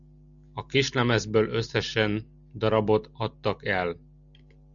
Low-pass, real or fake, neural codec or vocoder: 7.2 kHz; real; none